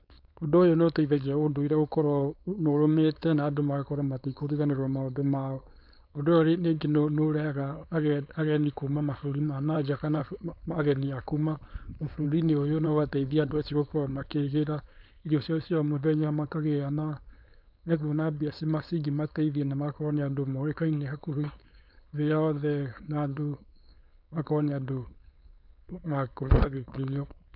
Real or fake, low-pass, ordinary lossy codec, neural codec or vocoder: fake; 5.4 kHz; none; codec, 16 kHz, 4.8 kbps, FACodec